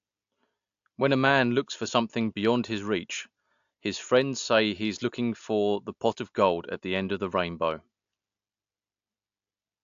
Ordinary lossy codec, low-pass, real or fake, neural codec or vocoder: AAC, 64 kbps; 7.2 kHz; real; none